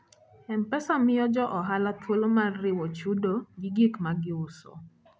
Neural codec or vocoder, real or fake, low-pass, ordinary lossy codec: none; real; none; none